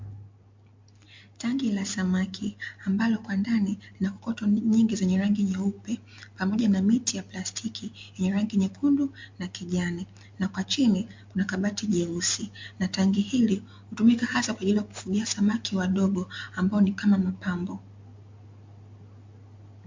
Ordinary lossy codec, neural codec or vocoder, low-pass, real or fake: MP3, 48 kbps; none; 7.2 kHz; real